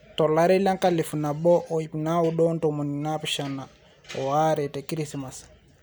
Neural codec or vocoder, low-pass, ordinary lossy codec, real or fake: none; none; none; real